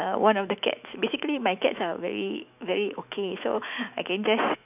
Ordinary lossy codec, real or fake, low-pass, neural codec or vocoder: none; fake; 3.6 kHz; autoencoder, 48 kHz, 128 numbers a frame, DAC-VAE, trained on Japanese speech